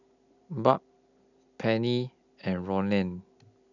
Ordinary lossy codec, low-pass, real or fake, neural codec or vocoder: none; 7.2 kHz; real; none